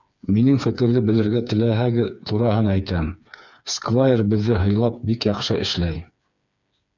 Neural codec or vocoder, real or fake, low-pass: codec, 16 kHz, 4 kbps, FreqCodec, smaller model; fake; 7.2 kHz